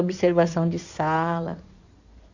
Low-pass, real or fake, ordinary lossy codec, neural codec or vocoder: 7.2 kHz; fake; none; codec, 16 kHz in and 24 kHz out, 2.2 kbps, FireRedTTS-2 codec